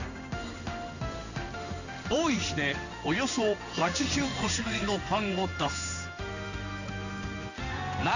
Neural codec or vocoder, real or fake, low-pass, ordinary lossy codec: codec, 16 kHz in and 24 kHz out, 1 kbps, XY-Tokenizer; fake; 7.2 kHz; none